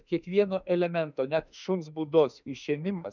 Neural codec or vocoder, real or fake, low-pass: autoencoder, 48 kHz, 32 numbers a frame, DAC-VAE, trained on Japanese speech; fake; 7.2 kHz